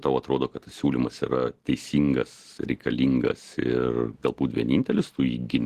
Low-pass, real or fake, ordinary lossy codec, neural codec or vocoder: 14.4 kHz; real; Opus, 16 kbps; none